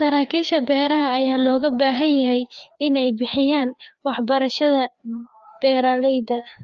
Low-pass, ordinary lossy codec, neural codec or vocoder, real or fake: 7.2 kHz; Opus, 24 kbps; codec, 16 kHz, 2 kbps, FreqCodec, larger model; fake